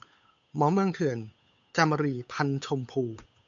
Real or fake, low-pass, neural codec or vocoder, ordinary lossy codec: fake; 7.2 kHz; codec, 16 kHz, 8 kbps, FunCodec, trained on Chinese and English, 25 frames a second; AAC, 64 kbps